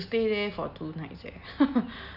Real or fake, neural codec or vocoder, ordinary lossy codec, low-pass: real; none; none; 5.4 kHz